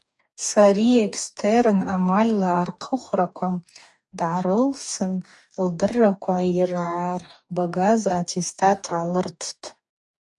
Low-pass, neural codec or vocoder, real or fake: 10.8 kHz; codec, 44.1 kHz, 2.6 kbps, DAC; fake